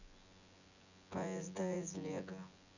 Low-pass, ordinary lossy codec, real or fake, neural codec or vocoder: 7.2 kHz; none; fake; vocoder, 24 kHz, 100 mel bands, Vocos